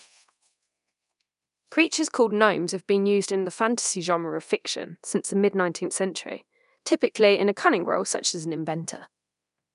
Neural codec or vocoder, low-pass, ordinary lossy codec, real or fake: codec, 24 kHz, 0.9 kbps, DualCodec; 10.8 kHz; none; fake